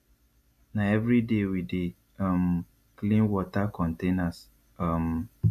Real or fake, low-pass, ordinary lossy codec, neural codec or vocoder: real; 14.4 kHz; AAC, 96 kbps; none